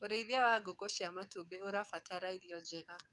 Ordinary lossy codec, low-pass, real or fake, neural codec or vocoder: none; 10.8 kHz; fake; codec, 44.1 kHz, 7.8 kbps, DAC